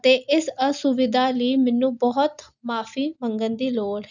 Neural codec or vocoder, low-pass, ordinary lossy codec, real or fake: none; 7.2 kHz; none; real